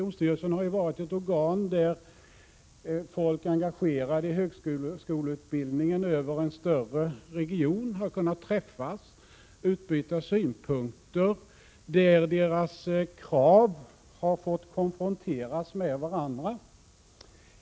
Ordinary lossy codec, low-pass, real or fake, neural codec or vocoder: none; none; real; none